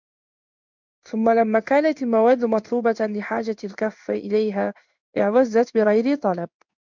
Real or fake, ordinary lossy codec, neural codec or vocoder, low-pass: fake; MP3, 64 kbps; codec, 16 kHz in and 24 kHz out, 1 kbps, XY-Tokenizer; 7.2 kHz